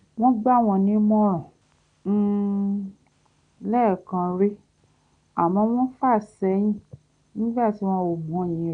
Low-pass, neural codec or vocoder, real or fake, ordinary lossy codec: 9.9 kHz; none; real; none